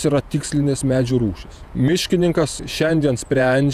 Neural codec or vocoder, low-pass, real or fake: vocoder, 48 kHz, 128 mel bands, Vocos; 14.4 kHz; fake